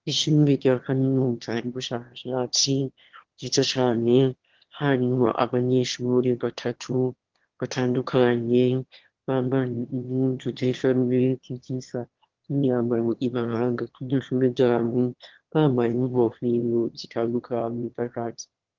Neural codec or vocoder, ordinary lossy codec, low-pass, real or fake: autoencoder, 22.05 kHz, a latent of 192 numbers a frame, VITS, trained on one speaker; Opus, 16 kbps; 7.2 kHz; fake